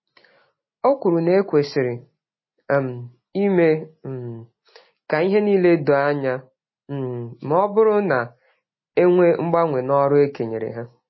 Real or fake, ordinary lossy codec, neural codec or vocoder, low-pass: real; MP3, 24 kbps; none; 7.2 kHz